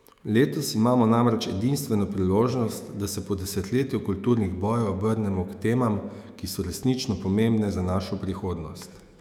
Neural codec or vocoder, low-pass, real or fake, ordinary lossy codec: autoencoder, 48 kHz, 128 numbers a frame, DAC-VAE, trained on Japanese speech; 19.8 kHz; fake; none